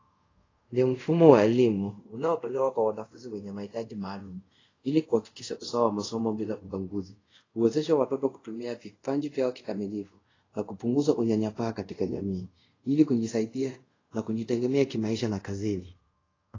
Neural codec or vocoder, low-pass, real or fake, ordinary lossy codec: codec, 24 kHz, 0.5 kbps, DualCodec; 7.2 kHz; fake; AAC, 32 kbps